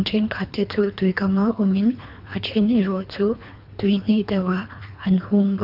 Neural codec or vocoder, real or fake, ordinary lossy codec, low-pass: codec, 24 kHz, 3 kbps, HILCodec; fake; none; 5.4 kHz